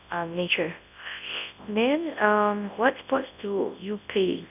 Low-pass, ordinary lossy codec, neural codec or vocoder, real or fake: 3.6 kHz; none; codec, 24 kHz, 0.9 kbps, WavTokenizer, large speech release; fake